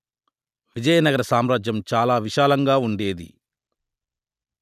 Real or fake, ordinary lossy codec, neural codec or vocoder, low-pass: real; none; none; 14.4 kHz